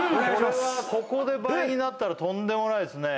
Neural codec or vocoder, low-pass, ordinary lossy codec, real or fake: none; none; none; real